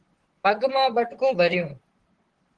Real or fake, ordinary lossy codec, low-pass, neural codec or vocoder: fake; Opus, 16 kbps; 9.9 kHz; vocoder, 44.1 kHz, 128 mel bands, Pupu-Vocoder